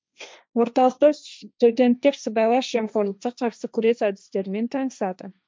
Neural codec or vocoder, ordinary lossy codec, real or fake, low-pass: codec, 16 kHz, 1.1 kbps, Voila-Tokenizer; none; fake; none